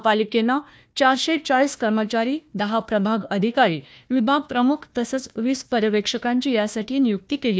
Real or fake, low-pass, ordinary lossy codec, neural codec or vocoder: fake; none; none; codec, 16 kHz, 1 kbps, FunCodec, trained on Chinese and English, 50 frames a second